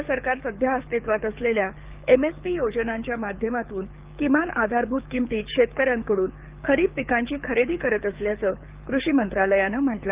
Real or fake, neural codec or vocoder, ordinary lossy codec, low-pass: fake; codec, 24 kHz, 6 kbps, HILCodec; Opus, 24 kbps; 3.6 kHz